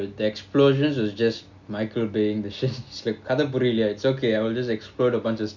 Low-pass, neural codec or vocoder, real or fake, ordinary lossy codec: 7.2 kHz; none; real; none